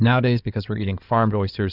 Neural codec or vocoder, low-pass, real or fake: codec, 16 kHz in and 24 kHz out, 2.2 kbps, FireRedTTS-2 codec; 5.4 kHz; fake